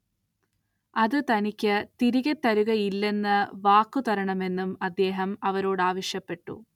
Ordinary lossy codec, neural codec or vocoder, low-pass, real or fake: none; none; 19.8 kHz; real